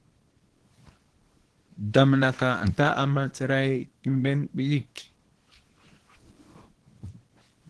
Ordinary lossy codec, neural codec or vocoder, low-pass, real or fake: Opus, 16 kbps; codec, 24 kHz, 0.9 kbps, WavTokenizer, small release; 10.8 kHz; fake